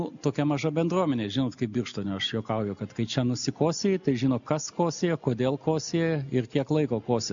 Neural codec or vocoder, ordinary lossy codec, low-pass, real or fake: none; MP3, 64 kbps; 7.2 kHz; real